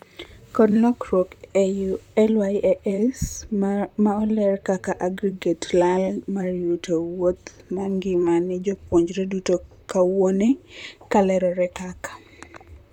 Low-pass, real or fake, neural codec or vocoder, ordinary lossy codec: 19.8 kHz; fake; vocoder, 44.1 kHz, 128 mel bands, Pupu-Vocoder; none